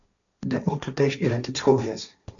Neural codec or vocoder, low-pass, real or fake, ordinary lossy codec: codec, 16 kHz, 1.1 kbps, Voila-Tokenizer; 7.2 kHz; fake; MP3, 96 kbps